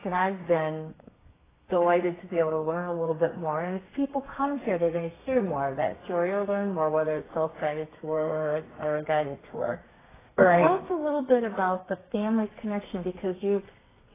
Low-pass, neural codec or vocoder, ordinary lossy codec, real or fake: 3.6 kHz; codec, 32 kHz, 1.9 kbps, SNAC; AAC, 16 kbps; fake